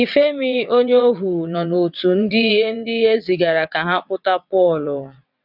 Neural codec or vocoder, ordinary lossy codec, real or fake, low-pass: vocoder, 22.05 kHz, 80 mel bands, WaveNeXt; none; fake; 5.4 kHz